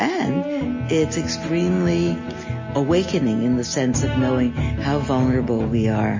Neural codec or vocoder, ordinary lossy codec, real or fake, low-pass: none; MP3, 32 kbps; real; 7.2 kHz